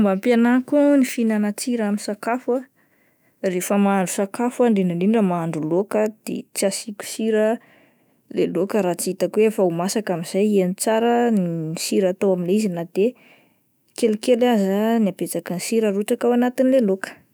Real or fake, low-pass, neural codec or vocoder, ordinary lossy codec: fake; none; autoencoder, 48 kHz, 128 numbers a frame, DAC-VAE, trained on Japanese speech; none